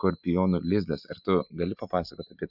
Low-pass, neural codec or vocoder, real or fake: 5.4 kHz; none; real